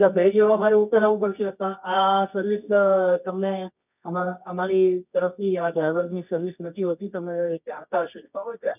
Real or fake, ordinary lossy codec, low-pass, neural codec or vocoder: fake; none; 3.6 kHz; codec, 24 kHz, 0.9 kbps, WavTokenizer, medium music audio release